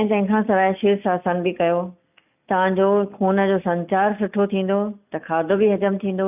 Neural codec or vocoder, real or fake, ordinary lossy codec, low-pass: none; real; none; 3.6 kHz